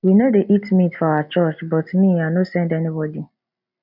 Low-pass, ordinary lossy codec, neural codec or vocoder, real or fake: 5.4 kHz; none; none; real